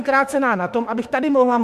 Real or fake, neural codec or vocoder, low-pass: fake; autoencoder, 48 kHz, 32 numbers a frame, DAC-VAE, trained on Japanese speech; 14.4 kHz